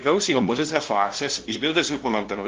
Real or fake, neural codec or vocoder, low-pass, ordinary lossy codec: fake; codec, 16 kHz, 0.5 kbps, FunCodec, trained on LibriTTS, 25 frames a second; 7.2 kHz; Opus, 16 kbps